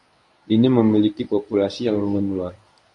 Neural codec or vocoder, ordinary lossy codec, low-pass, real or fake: codec, 24 kHz, 0.9 kbps, WavTokenizer, medium speech release version 1; AAC, 64 kbps; 10.8 kHz; fake